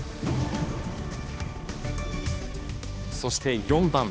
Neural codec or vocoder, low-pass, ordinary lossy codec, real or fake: codec, 16 kHz, 2 kbps, X-Codec, HuBERT features, trained on balanced general audio; none; none; fake